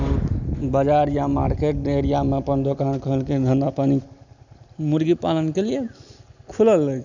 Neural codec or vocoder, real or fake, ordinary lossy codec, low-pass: none; real; none; 7.2 kHz